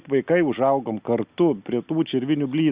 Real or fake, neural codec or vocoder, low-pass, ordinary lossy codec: real; none; 3.6 kHz; Opus, 64 kbps